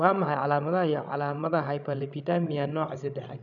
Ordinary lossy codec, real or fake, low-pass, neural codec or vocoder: none; fake; 7.2 kHz; codec, 16 kHz, 16 kbps, FreqCodec, larger model